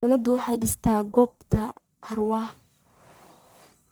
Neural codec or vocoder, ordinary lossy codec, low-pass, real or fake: codec, 44.1 kHz, 1.7 kbps, Pupu-Codec; none; none; fake